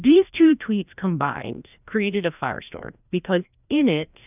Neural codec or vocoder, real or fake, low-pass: codec, 16 kHz, 1 kbps, FreqCodec, larger model; fake; 3.6 kHz